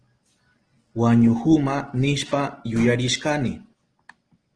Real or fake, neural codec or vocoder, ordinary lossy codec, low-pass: real; none; Opus, 16 kbps; 9.9 kHz